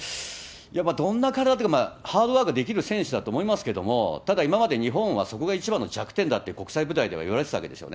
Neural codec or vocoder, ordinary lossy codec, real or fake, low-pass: none; none; real; none